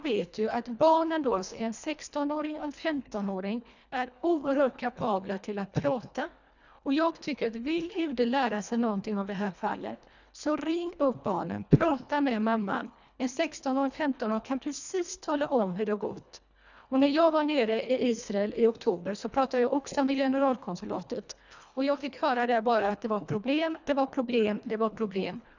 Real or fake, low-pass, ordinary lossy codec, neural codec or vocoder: fake; 7.2 kHz; none; codec, 24 kHz, 1.5 kbps, HILCodec